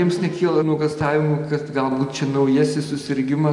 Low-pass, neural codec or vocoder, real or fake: 10.8 kHz; none; real